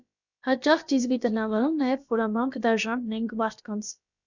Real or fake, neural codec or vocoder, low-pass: fake; codec, 16 kHz, about 1 kbps, DyCAST, with the encoder's durations; 7.2 kHz